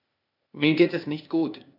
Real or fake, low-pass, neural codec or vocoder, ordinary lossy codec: fake; 5.4 kHz; codec, 16 kHz, 0.8 kbps, ZipCodec; none